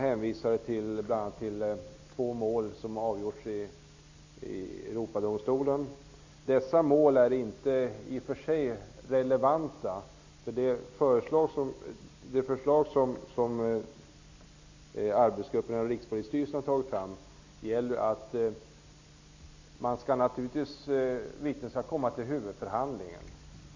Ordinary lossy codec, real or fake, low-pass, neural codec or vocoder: AAC, 48 kbps; real; 7.2 kHz; none